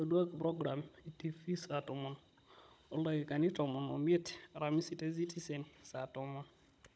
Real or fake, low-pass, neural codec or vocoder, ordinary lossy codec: fake; none; codec, 16 kHz, 16 kbps, FunCodec, trained on Chinese and English, 50 frames a second; none